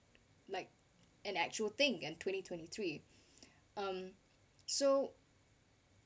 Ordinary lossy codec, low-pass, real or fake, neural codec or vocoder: none; none; real; none